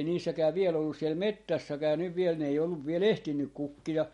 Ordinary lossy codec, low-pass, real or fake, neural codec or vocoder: MP3, 48 kbps; 19.8 kHz; real; none